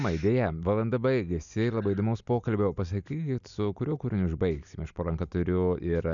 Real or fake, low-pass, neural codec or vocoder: real; 7.2 kHz; none